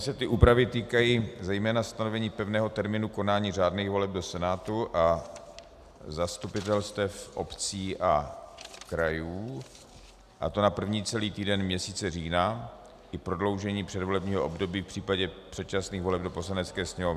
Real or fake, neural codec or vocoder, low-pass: real; none; 14.4 kHz